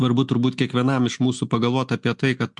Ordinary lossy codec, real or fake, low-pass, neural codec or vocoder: MP3, 64 kbps; real; 10.8 kHz; none